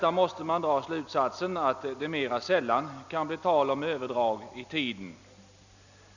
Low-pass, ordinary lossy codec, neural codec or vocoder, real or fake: 7.2 kHz; none; none; real